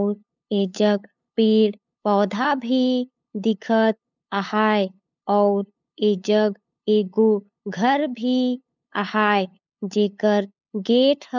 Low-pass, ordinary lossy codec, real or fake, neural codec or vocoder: 7.2 kHz; none; fake; codec, 16 kHz, 8 kbps, FunCodec, trained on LibriTTS, 25 frames a second